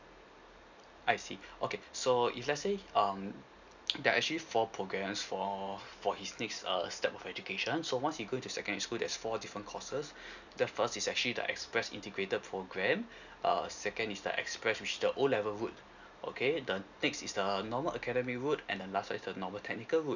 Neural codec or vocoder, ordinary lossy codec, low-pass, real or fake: none; none; 7.2 kHz; real